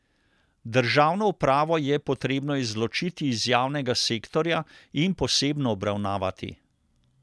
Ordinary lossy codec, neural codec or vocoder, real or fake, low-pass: none; none; real; none